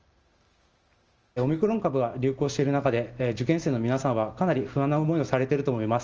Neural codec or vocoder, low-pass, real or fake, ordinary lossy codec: none; 7.2 kHz; real; Opus, 24 kbps